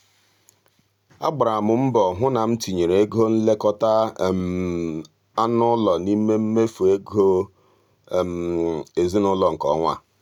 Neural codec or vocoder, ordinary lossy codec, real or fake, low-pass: none; none; real; 19.8 kHz